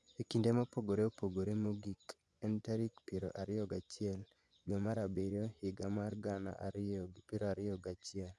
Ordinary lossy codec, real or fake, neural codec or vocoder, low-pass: none; fake; vocoder, 24 kHz, 100 mel bands, Vocos; none